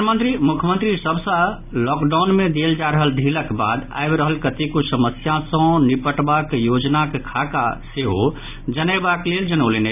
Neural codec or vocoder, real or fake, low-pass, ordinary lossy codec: none; real; 3.6 kHz; none